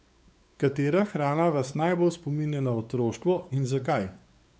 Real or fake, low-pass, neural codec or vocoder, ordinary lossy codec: fake; none; codec, 16 kHz, 4 kbps, X-Codec, WavLM features, trained on Multilingual LibriSpeech; none